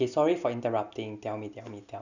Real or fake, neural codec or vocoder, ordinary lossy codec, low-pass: real; none; none; 7.2 kHz